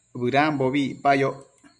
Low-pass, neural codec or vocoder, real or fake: 10.8 kHz; none; real